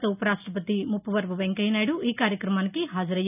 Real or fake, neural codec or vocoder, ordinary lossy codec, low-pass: real; none; none; 3.6 kHz